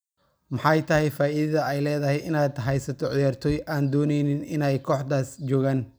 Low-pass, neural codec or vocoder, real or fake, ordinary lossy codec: none; none; real; none